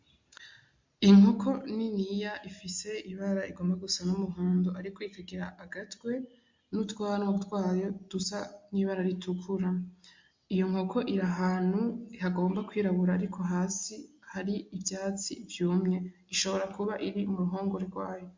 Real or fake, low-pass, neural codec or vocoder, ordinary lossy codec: real; 7.2 kHz; none; MP3, 48 kbps